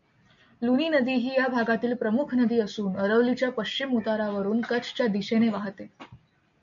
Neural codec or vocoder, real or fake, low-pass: none; real; 7.2 kHz